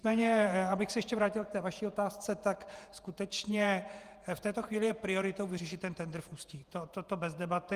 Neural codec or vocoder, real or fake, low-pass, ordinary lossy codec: vocoder, 48 kHz, 128 mel bands, Vocos; fake; 14.4 kHz; Opus, 32 kbps